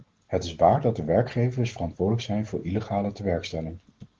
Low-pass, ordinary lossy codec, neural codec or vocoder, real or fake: 7.2 kHz; Opus, 16 kbps; none; real